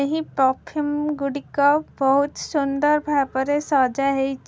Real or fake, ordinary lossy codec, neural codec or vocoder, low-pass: real; none; none; none